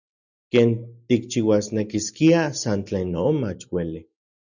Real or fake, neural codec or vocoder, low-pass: real; none; 7.2 kHz